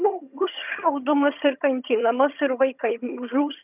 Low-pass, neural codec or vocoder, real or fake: 3.6 kHz; vocoder, 22.05 kHz, 80 mel bands, HiFi-GAN; fake